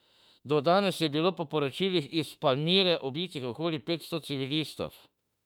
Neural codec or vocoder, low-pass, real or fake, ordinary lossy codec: autoencoder, 48 kHz, 32 numbers a frame, DAC-VAE, trained on Japanese speech; 19.8 kHz; fake; none